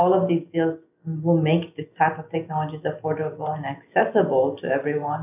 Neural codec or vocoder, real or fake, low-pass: none; real; 3.6 kHz